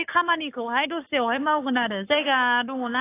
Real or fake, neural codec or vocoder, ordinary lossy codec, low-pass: fake; codec, 44.1 kHz, 7.8 kbps, Pupu-Codec; AAC, 24 kbps; 3.6 kHz